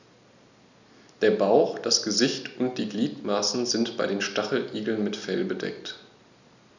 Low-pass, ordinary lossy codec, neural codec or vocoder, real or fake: 7.2 kHz; none; none; real